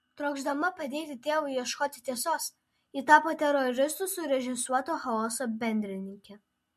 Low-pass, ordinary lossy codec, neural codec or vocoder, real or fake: 14.4 kHz; MP3, 64 kbps; none; real